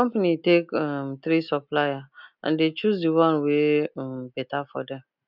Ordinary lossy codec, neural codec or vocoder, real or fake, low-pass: none; none; real; 5.4 kHz